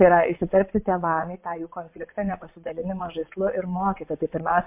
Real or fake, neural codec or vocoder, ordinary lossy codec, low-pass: fake; vocoder, 22.05 kHz, 80 mel bands, WaveNeXt; MP3, 32 kbps; 3.6 kHz